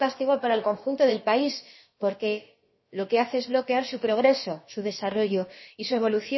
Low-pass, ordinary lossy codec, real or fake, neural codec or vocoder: 7.2 kHz; MP3, 24 kbps; fake; codec, 16 kHz, about 1 kbps, DyCAST, with the encoder's durations